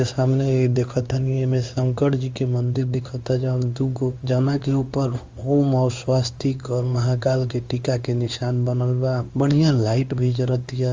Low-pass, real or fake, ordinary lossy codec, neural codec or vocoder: 7.2 kHz; fake; Opus, 32 kbps; codec, 16 kHz in and 24 kHz out, 1 kbps, XY-Tokenizer